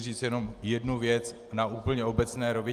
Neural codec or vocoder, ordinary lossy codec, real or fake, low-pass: none; Opus, 32 kbps; real; 14.4 kHz